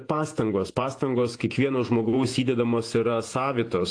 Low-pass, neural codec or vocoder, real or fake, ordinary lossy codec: 9.9 kHz; vocoder, 48 kHz, 128 mel bands, Vocos; fake; AAC, 48 kbps